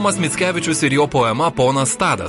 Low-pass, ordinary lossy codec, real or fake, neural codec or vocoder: 14.4 kHz; MP3, 48 kbps; real; none